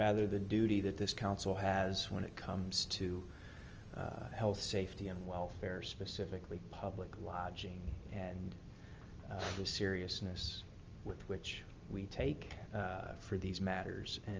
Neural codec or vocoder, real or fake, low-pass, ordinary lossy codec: none; real; 7.2 kHz; Opus, 24 kbps